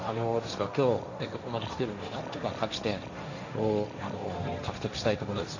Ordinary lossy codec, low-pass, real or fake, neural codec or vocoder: none; 7.2 kHz; fake; codec, 16 kHz, 1.1 kbps, Voila-Tokenizer